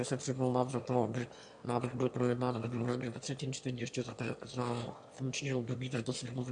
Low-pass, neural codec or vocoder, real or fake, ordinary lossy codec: 9.9 kHz; autoencoder, 22.05 kHz, a latent of 192 numbers a frame, VITS, trained on one speaker; fake; AAC, 64 kbps